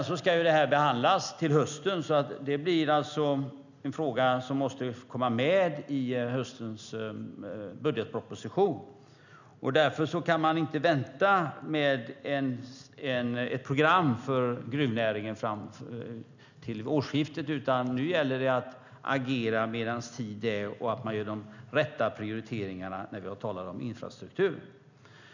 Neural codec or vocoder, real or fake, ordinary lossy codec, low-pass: none; real; none; 7.2 kHz